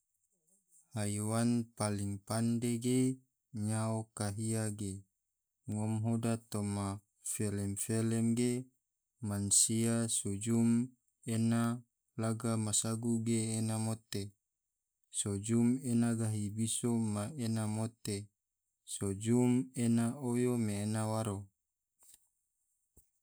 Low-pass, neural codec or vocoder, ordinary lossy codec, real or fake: none; none; none; real